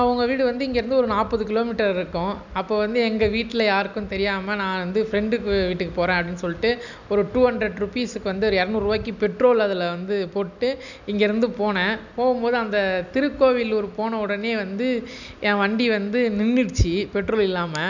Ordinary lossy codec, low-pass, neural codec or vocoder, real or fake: none; 7.2 kHz; none; real